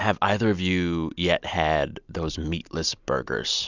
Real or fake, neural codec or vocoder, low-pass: real; none; 7.2 kHz